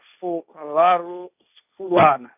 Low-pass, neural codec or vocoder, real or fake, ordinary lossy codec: 3.6 kHz; codec, 16 kHz, 1.1 kbps, Voila-Tokenizer; fake; none